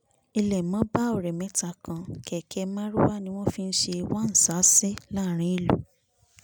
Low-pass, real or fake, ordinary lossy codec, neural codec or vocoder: none; real; none; none